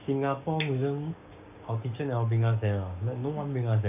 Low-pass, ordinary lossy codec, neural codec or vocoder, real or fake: 3.6 kHz; none; codec, 16 kHz, 6 kbps, DAC; fake